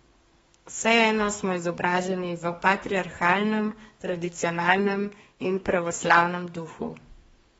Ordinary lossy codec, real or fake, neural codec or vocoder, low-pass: AAC, 24 kbps; fake; codec, 32 kHz, 1.9 kbps, SNAC; 14.4 kHz